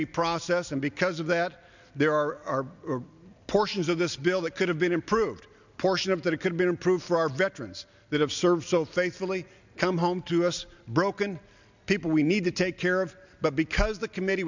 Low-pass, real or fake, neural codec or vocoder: 7.2 kHz; real; none